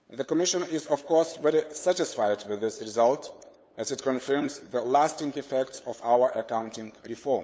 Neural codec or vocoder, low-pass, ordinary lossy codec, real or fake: codec, 16 kHz, 8 kbps, FunCodec, trained on LibriTTS, 25 frames a second; none; none; fake